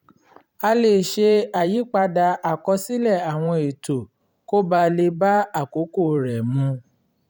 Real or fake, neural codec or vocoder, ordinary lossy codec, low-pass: fake; vocoder, 44.1 kHz, 128 mel bands every 512 samples, BigVGAN v2; none; 19.8 kHz